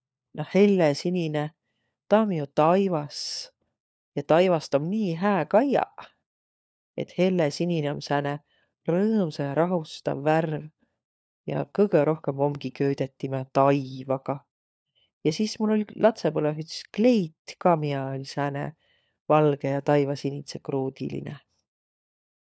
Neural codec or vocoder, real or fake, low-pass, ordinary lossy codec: codec, 16 kHz, 4 kbps, FunCodec, trained on LibriTTS, 50 frames a second; fake; none; none